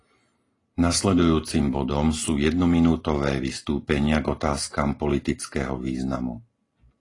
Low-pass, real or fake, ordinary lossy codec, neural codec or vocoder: 10.8 kHz; real; AAC, 32 kbps; none